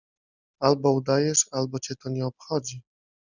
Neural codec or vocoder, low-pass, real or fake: none; 7.2 kHz; real